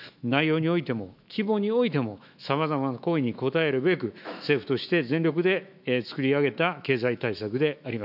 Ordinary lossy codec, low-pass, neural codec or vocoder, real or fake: none; 5.4 kHz; autoencoder, 48 kHz, 128 numbers a frame, DAC-VAE, trained on Japanese speech; fake